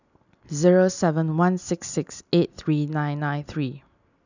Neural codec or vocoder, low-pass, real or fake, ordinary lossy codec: none; 7.2 kHz; real; none